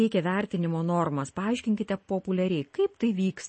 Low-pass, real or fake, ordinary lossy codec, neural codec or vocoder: 9.9 kHz; real; MP3, 32 kbps; none